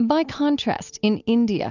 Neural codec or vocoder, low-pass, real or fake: none; 7.2 kHz; real